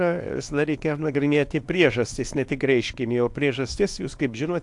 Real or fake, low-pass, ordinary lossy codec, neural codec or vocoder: fake; 10.8 kHz; AAC, 64 kbps; codec, 24 kHz, 0.9 kbps, WavTokenizer, small release